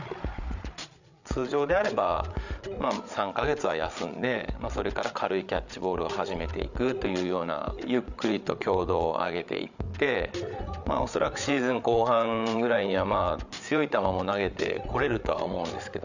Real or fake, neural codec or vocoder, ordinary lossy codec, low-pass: fake; codec, 16 kHz, 8 kbps, FreqCodec, larger model; none; 7.2 kHz